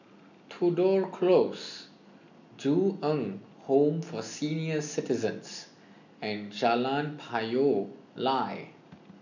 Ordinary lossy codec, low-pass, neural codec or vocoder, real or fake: none; 7.2 kHz; none; real